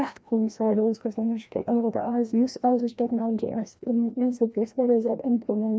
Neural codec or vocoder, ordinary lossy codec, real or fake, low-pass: codec, 16 kHz, 1 kbps, FreqCodec, larger model; none; fake; none